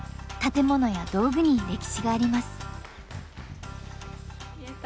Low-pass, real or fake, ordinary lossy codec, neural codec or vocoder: none; real; none; none